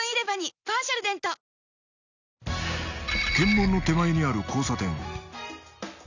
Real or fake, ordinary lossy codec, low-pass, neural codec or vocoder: real; none; 7.2 kHz; none